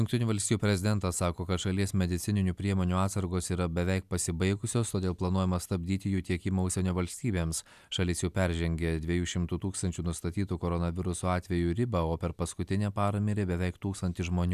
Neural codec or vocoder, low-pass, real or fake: none; 14.4 kHz; real